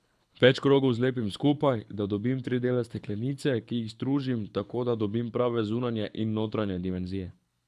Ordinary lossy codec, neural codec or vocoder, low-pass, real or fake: none; codec, 24 kHz, 6 kbps, HILCodec; none; fake